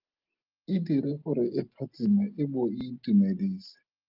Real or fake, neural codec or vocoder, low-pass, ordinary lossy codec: real; none; 5.4 kHz; Opus, 32 kbps